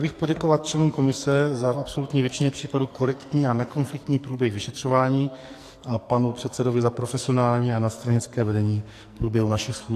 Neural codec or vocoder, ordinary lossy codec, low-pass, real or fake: codec, 44.1 kHz, 2.6 kbps, SNAC; AAC, 64 kbps; 14.4 kHz; fake